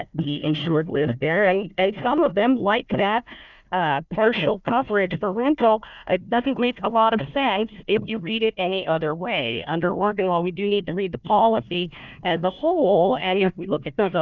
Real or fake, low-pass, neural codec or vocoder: fake; 7.2 kHz; codec, 16 kHz, 1 kbps, FunCodec, trained on Chinese and English, 50 frames a second